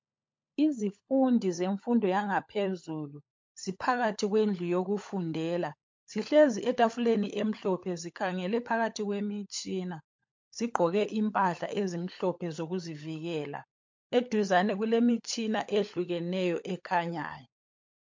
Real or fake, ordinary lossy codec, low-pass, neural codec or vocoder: fake; MP3, 48 kbps; 7.2 kHz; codec, 16 kHz, 16 kbps, FunCodec, trained on LibriTTS, 50 frames a second